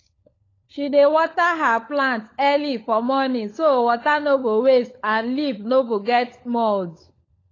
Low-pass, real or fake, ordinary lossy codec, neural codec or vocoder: 7.2 kHz; fake; AAC, 32 kbps; codec, 16 kHz, 16 kbps, FunCodec, trained on LibriTTS, 50 frames a second